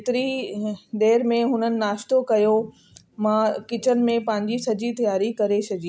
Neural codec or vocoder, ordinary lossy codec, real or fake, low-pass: none; none; real; none